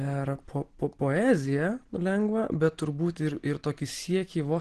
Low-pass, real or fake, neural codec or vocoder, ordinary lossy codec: 10.8 kHz; real; none; Opus, 16 kbps